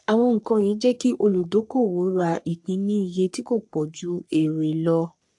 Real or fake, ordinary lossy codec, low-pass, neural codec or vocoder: fake; AAC, 64 kbps; 10.8 kHz; codec, 44.1 kHz, 3.4 kbps, Pupu-Codec